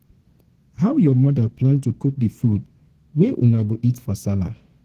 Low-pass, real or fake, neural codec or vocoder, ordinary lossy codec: 14.4 kHz; fake; codec, 32 kHz, 1.9 kbps, SNAC; Opus, 16 kbps